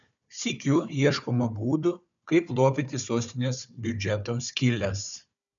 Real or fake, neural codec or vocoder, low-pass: fake; codec, 16 kHz, 4 kbps, FunCodec, trained on Chinese and English, 50 frames a second; 7.2 kHz